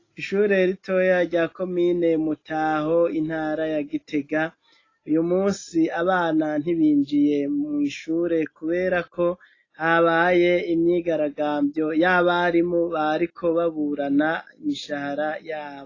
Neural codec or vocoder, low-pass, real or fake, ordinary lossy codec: none; 7.2 kHz; real; AAC, 32 kbps